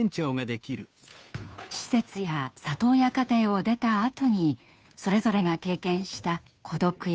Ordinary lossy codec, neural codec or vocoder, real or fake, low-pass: none; codec, 16 kHz, 2 kbps, FunCodec, trained on Chinese and English, 25 frames a second; fake; none